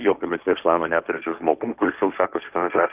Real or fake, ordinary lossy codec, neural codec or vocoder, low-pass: fake; Opus, 24 kbps; codec, 16 kHz, 1.1 kbps, Voila-Tokenizer; 3.6 kHz